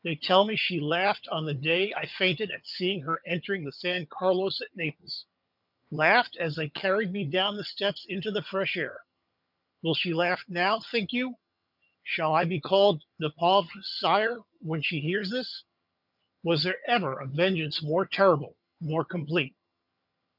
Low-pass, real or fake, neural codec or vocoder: 5.4 kHz; fake; vocoder, 22.05 kHz, 80 mel bands, HiFi-GAN